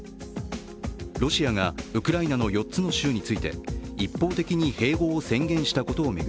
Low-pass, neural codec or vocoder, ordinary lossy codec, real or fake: none; none; none; real